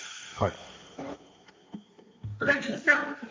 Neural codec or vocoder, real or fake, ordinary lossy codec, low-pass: codec, 16 kHz, 1.1 kbps, Voila-Tokenizer; fake; none; none